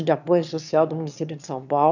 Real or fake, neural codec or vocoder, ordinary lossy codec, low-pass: fake; autoencoder, 22.05 kHz, a latent of 192 numbers a frame, VITS, trained on one speaker; none; 7.2 kHz